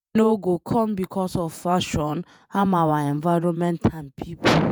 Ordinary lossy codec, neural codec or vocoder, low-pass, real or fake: none; vocoder, 48 kHz, 128 mel bands, Vocos; none; fake